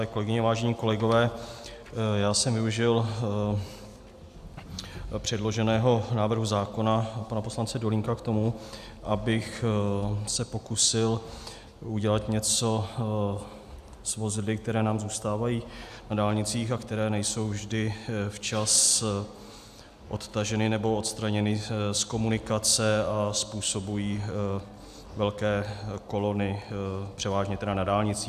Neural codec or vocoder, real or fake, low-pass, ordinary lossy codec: none; real; 14.4 kHz; AAC, 96 kbps